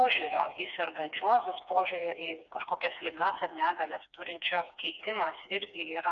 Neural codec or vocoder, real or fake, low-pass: codec, 16 kHz, 2 kbps, FreqCodec, smaller model; fake; 7.2 kHz